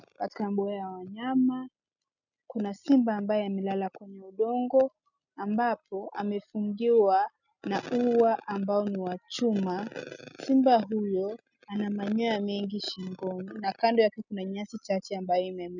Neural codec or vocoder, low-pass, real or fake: none; 7.2 kHz; real